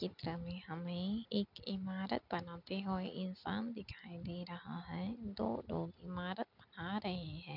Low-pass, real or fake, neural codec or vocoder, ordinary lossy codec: 5.4 kHz; real; none; none